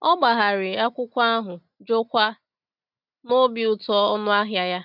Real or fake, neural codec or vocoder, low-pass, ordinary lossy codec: real; none; 5.4 kHz; none